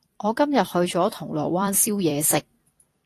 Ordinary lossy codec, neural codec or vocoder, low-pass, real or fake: AAC, 48 kbps; vocoder, 44.1 kHz, 128 mel bands every 256 samples, BigVGAN v2; 14.4 kHz; fake